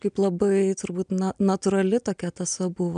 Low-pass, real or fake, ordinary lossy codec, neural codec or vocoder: 9.9 kHz; real; MP3, 96 kbps; none